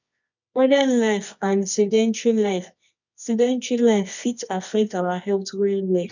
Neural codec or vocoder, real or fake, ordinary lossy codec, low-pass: codec, 24 kHz, 0.9 kbps, WavTokenizer, medium music audio release; fake; none; 7.2 kHz